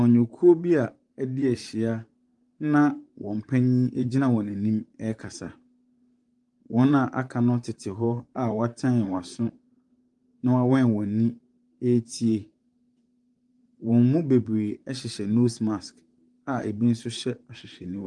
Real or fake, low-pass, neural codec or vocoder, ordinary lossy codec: fake; 10.8 kHz; vocoder, 44.1 kHz, 128 mel bands, Pupu-Vocoder; Opus, 32 kbps